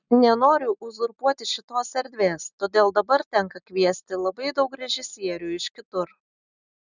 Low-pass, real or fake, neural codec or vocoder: 7.2 kHz; real; none